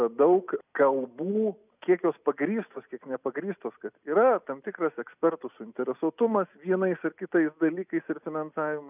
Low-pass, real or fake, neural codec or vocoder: 3.6 kHz; real; none